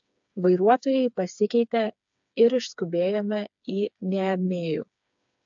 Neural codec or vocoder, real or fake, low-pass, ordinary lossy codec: codec, 16 kHz, 4 kbps, FreqCodec, smaller model; fake; 7.2 kHz; AAC, 64 kbps